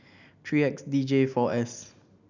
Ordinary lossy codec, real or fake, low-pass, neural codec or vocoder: none; real; 7.2 kHz; none